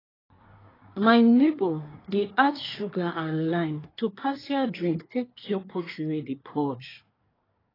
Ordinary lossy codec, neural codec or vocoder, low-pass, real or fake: AAC, 24 kbps; codec, 24 kHz, 1 kbps, SNAC; 5.4 kHz; fake